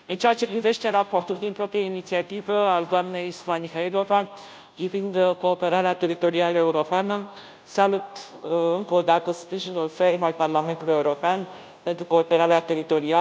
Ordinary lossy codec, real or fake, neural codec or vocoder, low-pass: none; fake; codec, 16 kHz, 0.5 kbps, FunCodec, trained on Chinese and English, 25 frames a second; none